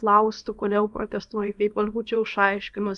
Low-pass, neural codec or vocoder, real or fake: 10.8 kHz; codec, 24 kHz, 0.9 kbps, WavTokenizer, small release; fake